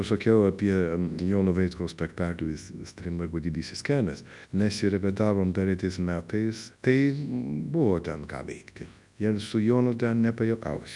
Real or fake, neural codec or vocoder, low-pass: fake; codec, 24 kHz, 0.9 kbps, WavTokenizer, large speech release; 10.8 kHz